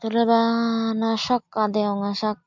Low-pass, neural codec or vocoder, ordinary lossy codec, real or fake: 7.2 kHz; none; MP3, 64 kbps; real